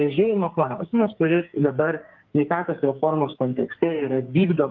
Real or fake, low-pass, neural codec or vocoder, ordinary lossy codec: fake; 7.2 kHz; codec, 44.1 kHz, 2.6 kbps, SNAC; Opus, 32 kbps